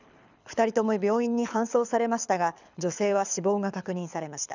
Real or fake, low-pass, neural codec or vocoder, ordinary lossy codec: fake; 7.2 kHz; codec, 24 kHz, 6 kbps, HILCodec; none